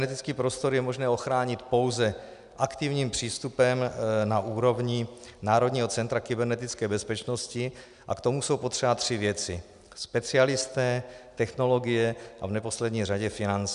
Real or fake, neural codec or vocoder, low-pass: real; none; 10.8 kHz